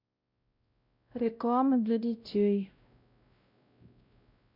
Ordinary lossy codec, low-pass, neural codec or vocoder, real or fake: none; 5.4 kHz; codec, 16 kHz, 0.5 kbps, X-Codec, WavLM features, trained on Multilingual LibriSpeech; fake